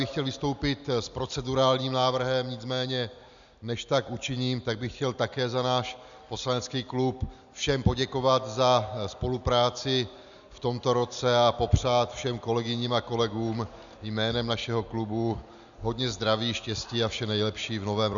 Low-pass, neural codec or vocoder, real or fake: 7.2 kHz; none; real